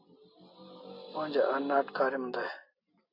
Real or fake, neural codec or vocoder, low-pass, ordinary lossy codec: real; none; 5.4 kHz; AAC, 32 kbps